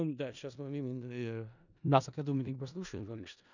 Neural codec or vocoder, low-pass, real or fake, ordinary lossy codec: codec, 16 kHz in and 24 kHz out, 0.4 kbps, LongCat-Audio-Codec, four codebook decoder; 7.2 kHz; fake; none